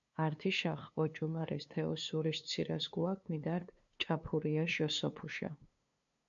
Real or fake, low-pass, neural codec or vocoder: fake; 7.2 kHz; codec, 16 kHz, 2 kbps, FunCodec, trained on LibriTTS, 25 frames a second